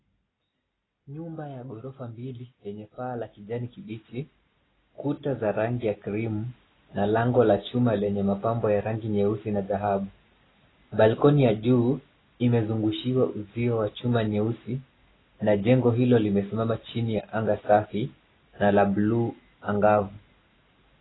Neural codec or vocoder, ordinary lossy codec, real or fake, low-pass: none; AAC, 16 kbps; real; 7.2 kHz